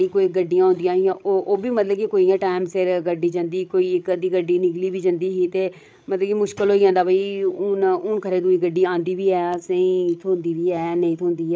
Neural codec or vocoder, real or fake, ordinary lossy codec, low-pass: codec, 16 kHz, 16 kbps, FreqCodec, larger model; fake; none; none